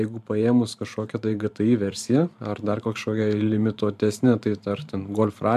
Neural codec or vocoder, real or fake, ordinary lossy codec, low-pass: vocoder, 44.1 kHz, 128 mel bands every 512 samples, BigVGAN v2; fake; MP3, 96 kbps; 14.4 kHz